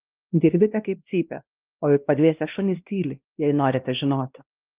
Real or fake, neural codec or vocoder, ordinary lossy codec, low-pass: fake; codec, 16 kHz, 1 kbps, X-Codec, WavLM features, trained on Multilingual LibriSpeech; Opus, 24 kbps; 3.6 kHz